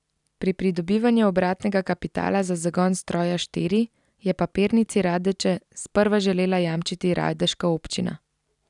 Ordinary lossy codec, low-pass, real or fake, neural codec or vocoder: none; 10.8 kHz; real; none